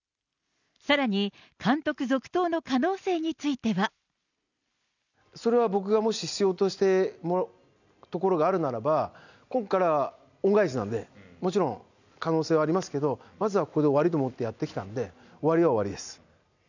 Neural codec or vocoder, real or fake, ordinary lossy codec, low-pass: none; real; none; 7.2 kHz